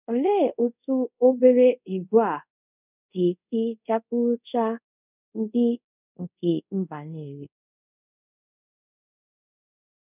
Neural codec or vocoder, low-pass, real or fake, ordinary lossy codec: codec, 24 kHz, 0.5 kbps, DualCodec; 3.6 kHz; fake; none